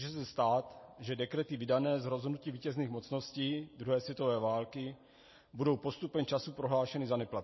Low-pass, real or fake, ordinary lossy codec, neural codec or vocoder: 7.2 kHz; real; MP3, 24 kbps; none